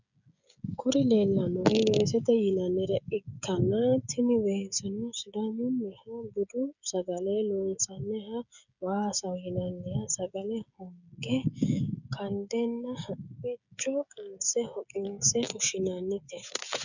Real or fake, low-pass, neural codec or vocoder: fake; 7.2 kHz; codec, 16 kHz, 16 kbps, FreqCodec, smaller model